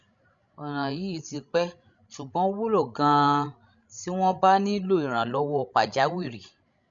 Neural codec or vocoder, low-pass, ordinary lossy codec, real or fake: codec, 16 kHz, 16 kbps, FreqCodec, larger model; 7.2 kHz; none; fake